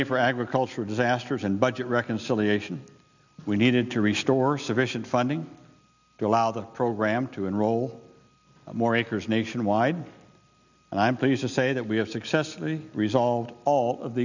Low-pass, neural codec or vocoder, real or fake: 7.2 kHz; none; real